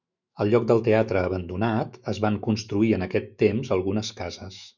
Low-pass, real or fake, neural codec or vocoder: 7.2 kHz; fake; autoencoder, 48 kHz, 128 numbers a frame, DAC-VAE, trained on Japanese speech